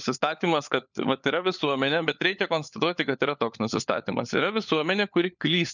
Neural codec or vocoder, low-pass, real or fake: codec, 16 kHz, 4 kbps, FunCodec, trained on LibriTTS, 50 frames a second; 7.2 kHz; fake